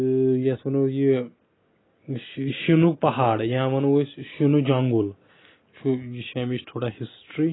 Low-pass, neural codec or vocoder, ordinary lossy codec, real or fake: 7.2 kHz; none; AAC, 16 kbps; real